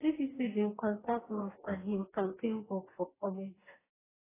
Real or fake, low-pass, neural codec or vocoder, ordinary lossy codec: fake; 3.6 kHz; codec, 44.1 kHz, 2.6 kbps, DAC; AAC, 16 kbps